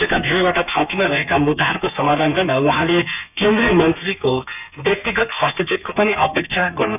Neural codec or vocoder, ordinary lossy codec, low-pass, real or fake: codec, 32 kHz, 1.9 kbps, SNAC; none; 3.6 kHz; fake